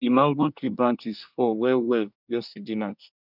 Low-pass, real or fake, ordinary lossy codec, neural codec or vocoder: 5.4 kHz; fake; none; codec, 24 kHz, 1 kbps, SNAC